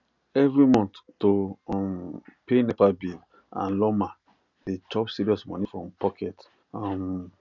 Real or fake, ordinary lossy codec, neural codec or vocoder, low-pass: real; none; none; 7.2 kHz